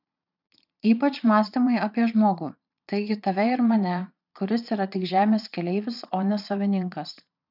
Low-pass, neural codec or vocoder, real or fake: 5.4 kHz; vocoder, 22.05 kHz, 80 mel bands, Vocos; fake